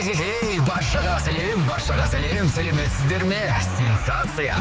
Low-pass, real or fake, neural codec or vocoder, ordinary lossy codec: none; fake; codec, 16 kHz, 4 kbps, X-Codec, HuBERT features, trained on general audio; none